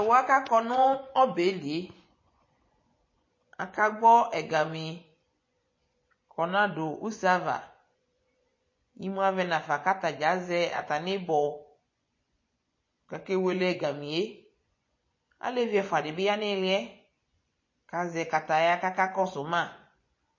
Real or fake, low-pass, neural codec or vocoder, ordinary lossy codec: fake; 7.2 kHz; vocoder, 24 kHz, 100 mel bands, Vocos; MP3, 32 kbps